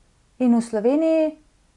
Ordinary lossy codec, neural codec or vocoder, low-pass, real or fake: none; none; 10.8 kHz; real